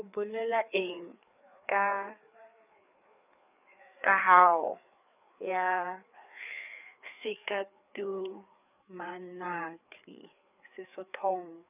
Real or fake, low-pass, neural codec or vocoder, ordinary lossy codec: fake; 3.6 kHz; codec, 16 kHz, 4 kbps, FreqCodec, larger model; none